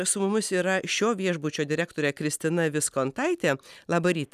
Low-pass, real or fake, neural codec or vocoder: 14.4 kHz; real; none